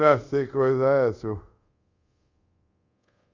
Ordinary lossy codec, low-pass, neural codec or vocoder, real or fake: Opus, 64 kbps; 7.2 kHz; codec, 24 kHz, 0.5 kbps, DualCodec; fake